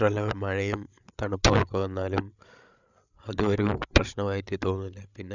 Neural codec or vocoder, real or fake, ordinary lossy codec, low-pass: codec, 16 kHz, 8 kbps, FreqCodec, larger model; fake; none; 7.2 kHz